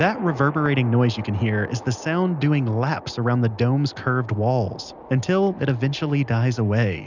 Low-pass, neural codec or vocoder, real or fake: 7.2 kHz; none; real